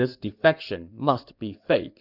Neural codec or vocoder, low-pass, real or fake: codec, 16 kHz in and 24 kHz out, 2.2 kbps, FireRedTTS-2 codec; 5.4 kHz; fake